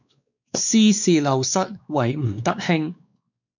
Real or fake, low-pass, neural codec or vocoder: fake; 7.2 kHz; codec, 16 kHz, 2 kbps, X-Codec, WavLM features, trained on Multilingual LibriSpeech